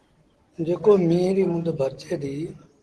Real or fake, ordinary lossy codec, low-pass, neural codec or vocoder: real; Opus, 16 kbps; 10.8 kHz; none